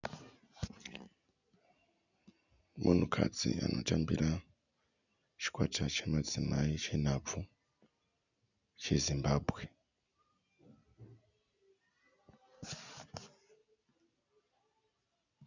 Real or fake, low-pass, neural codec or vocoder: real; 7.2 kHz; none